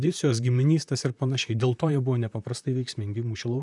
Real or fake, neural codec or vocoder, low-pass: fake; vocoder, 44.1 kHz, 128 mel bands, Pupu-Vocoder; 10.8 kHz